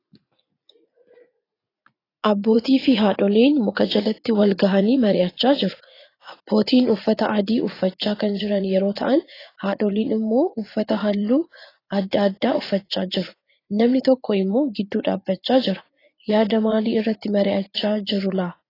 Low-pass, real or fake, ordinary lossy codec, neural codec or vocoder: 5.4 kHz; fake; AAC, 24 kbps; vocoder, 44.1 kHz, 80 mel bands, Vocos